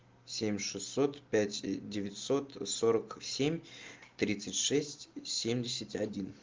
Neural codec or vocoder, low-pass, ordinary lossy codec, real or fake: none; 7.2 kHz; Opus, 32 kbps; real